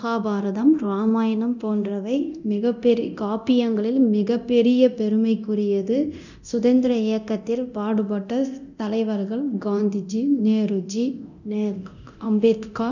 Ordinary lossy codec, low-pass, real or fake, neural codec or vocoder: none; 7.2 kHz; fake; codec, 24 kHz, 0.9 kbps, DualCodec